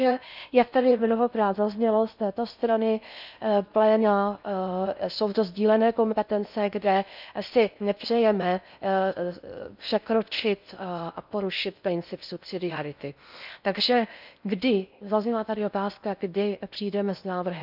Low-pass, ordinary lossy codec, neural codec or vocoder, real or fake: 5.4 kHz; none; codec, 16 kHz in and 24 kHz out, 0.6 kbps, FocalCodec, streaming, 4096 codes; fake